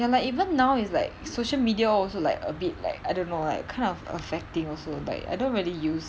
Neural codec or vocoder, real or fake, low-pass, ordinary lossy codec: none; real; none; none